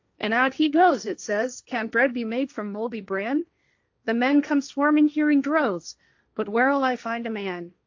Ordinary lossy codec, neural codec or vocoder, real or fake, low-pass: AAC, 48 kbps; codec, 16 kHz, 1.1 kbps, Voila-Tokenizer; fake; 7.2 kHz